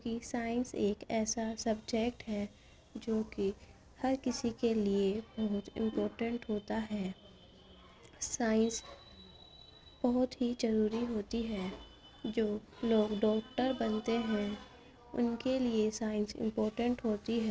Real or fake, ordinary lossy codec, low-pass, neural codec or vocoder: real; none; none; none